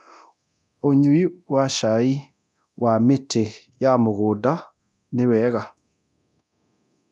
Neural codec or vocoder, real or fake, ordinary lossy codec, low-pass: codec, 24 kHz, 0.9 kbps, DualCodec; fake; none; none